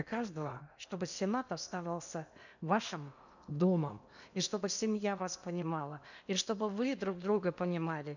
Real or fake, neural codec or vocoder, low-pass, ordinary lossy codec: fake; codec, 16 kHz in and 24 kHz out, 0.8 kbps, FocalCodec, streaming, 65536 codes; 7.2 kHz; none